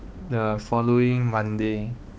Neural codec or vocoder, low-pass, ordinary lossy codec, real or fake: codec, 16 kHz, 2 kbps, X-Codec, HuBERT features, trained on balanced general audio; none; none; fake